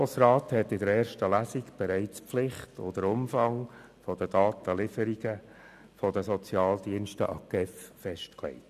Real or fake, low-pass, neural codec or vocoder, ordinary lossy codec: real; 14.4 kHz; none; none